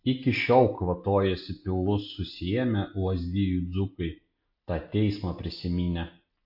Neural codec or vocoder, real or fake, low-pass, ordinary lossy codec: none; real; 5.4 kHz; MP3, 32 kbps